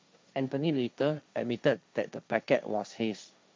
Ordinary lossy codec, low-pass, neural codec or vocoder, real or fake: none; none; codec, 16 kHz, 1.1 kbps, Voila-Tokenizer; fake